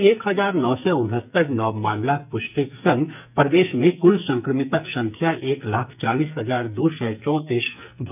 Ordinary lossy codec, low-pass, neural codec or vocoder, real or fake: none; 3.6 kHz; codec, 44.1 kHz, 2.6 kbps, SNAC; fake